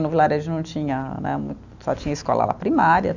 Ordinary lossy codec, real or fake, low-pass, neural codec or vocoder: none; real; 7.2 kHz; none